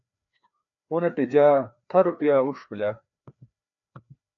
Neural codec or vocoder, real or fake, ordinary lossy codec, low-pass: codec, 16 kHz, 2 kbps, FreqCodec, larger model; fake; MP3, 64 kbps; 7.2 kHz